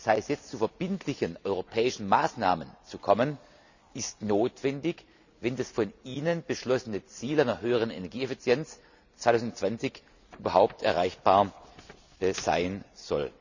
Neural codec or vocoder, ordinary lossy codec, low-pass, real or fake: none; none; 7.2 kHz; real